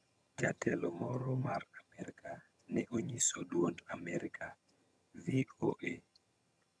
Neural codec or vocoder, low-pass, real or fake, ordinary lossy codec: vocoder, 22.05 kHz, 80 mel bands, HiFi-GAN; none; fake; none